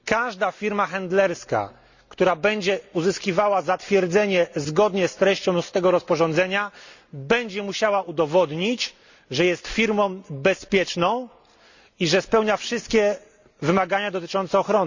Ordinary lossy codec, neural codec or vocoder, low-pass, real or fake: Opus, 64 kbps; none; 7.2 kHz; real